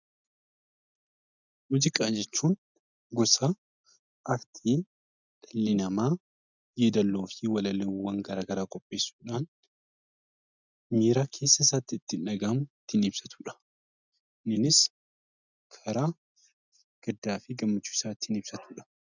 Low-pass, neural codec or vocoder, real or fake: 7.2 kHz; none; real